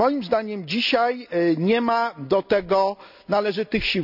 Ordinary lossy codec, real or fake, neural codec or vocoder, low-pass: none; real; none; 5.4 kHz